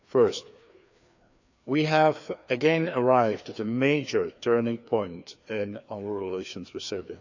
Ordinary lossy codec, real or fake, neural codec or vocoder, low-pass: none; fake; codec, 16 kHz, 2 kbps, FreqCodec, larger model; 7.2 kHz